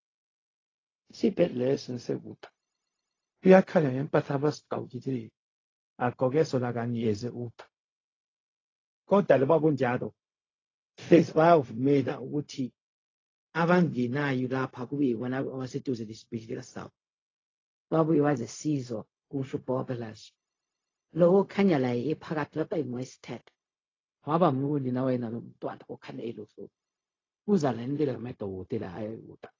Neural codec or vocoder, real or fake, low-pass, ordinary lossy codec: codec, 16 kHz, 0.4 kbps, LongCat-Audio-Codec; fake; 7.2 kHz; AAC, 32 kbps